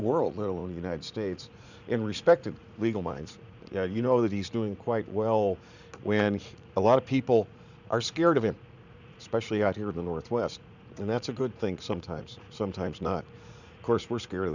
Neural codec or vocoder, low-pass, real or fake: vocoder, 44.1 kHz, 80 mel bands, Vocos; 7.2 kHz; fake